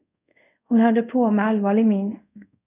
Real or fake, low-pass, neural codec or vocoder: fake; 3.6 kHz; codec, 24 kHz, 0.5 kbps, DualCodec